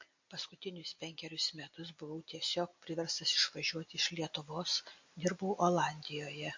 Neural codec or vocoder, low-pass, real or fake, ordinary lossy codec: none; 7.2 kHz; real; MP3, 48 kbps